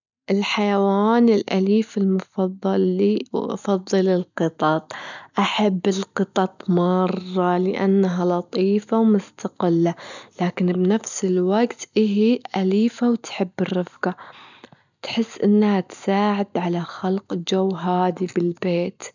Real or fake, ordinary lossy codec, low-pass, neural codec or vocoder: real; none; 7.2 kHz; none